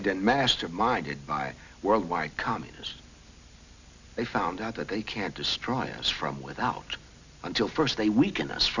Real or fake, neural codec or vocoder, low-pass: real; none; 7.2 kHz